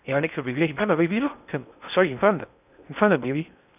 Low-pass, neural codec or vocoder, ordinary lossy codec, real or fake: 3.6 kHz; codec, 16 kHz in and 24 kHz out, 0.6 kbps, FocalCodec, streaming, 4096 codes; none; fake